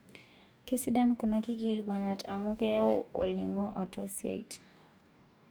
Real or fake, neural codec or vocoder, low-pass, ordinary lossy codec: fake; codec, 44.1 kHz, 2.6 kbps, DAC; none; none